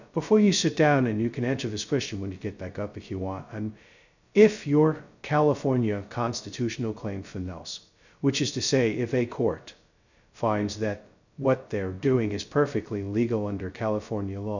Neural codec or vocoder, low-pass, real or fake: codec, 16 kHz, 0.2 kbps, FocalCodec; 7.2 kHz; fake